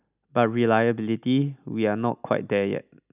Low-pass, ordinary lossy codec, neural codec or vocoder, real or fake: 3.6 kHz; none; none; real